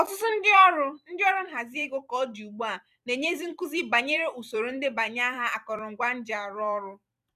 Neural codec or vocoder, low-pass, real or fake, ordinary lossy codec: vocoder, 44.1 kHz, 128 mel bands every 256 samples, BigVGAN v2; 14.4 kHz; fake; none